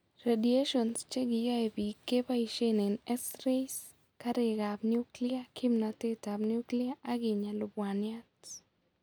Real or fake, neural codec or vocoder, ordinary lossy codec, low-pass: real; none; none; none